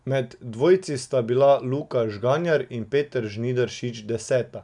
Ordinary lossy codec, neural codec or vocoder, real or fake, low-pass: none; none; real; 10.8 kHz